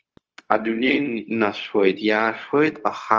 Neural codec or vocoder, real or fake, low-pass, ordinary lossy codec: codec, 16 kHz, 0.4 kbps, LongCat-Audio-Codec; fake; 7.2 kHz; Opus, 24 kbps